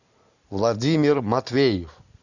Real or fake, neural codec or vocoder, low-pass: real; none; 7.2 kHz